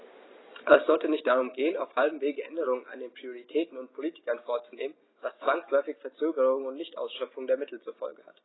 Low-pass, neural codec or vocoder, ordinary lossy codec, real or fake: 7.2 kHz; none; AAC, 16 kbps; real